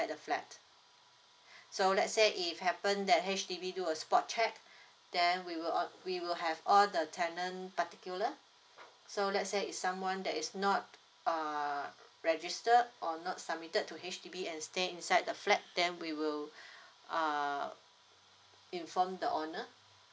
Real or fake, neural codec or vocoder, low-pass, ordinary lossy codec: real; none; none; none